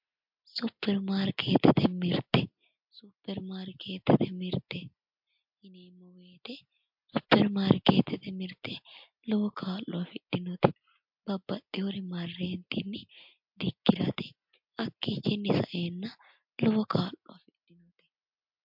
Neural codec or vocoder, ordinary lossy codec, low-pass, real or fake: none; MP3, 48 kbps; 5.4 kHz; real